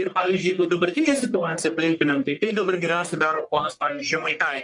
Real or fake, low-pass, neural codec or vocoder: fake; 10.8 kHz; codec, 44.1 kHz, 1.7 kbps, Pupu-Codec